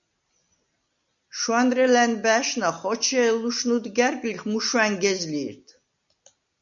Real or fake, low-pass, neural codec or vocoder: real; 7.2 kHz; none